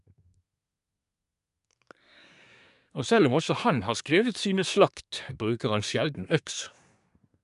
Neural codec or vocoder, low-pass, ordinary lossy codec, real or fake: codec, 24 kHz, 1 kbps, SNAC; 10.8 kHz; none; fake